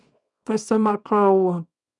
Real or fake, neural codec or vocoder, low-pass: fake; codec, 24 kHz, 0.9 kbps, WavTokenizer, small release; 10.8 kHz